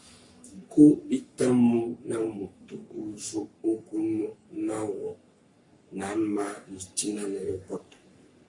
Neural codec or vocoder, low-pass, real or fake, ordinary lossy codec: codec, 44.1 kHz, 3.4 kbps, Pupu-Codec; 10.8 kHz; fake; MP3, 48 kbps